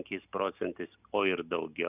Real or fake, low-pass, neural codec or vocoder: real; 3.6 kHz; none